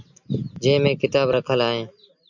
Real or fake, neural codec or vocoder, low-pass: real; none; 7.2 kHz